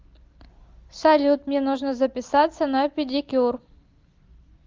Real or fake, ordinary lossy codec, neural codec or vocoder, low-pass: real; Opus, 32 kbps; none; 7.2 kHz